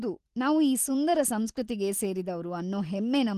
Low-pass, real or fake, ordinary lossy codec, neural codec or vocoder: 14.4 kHz; real; Opus, 16 kbps; none